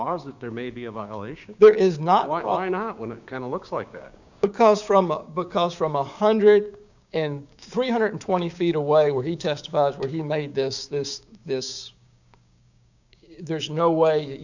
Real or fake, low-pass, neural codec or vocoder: fake; 7.2 kHz; codec, 16 kHz, 6 kbps, DAC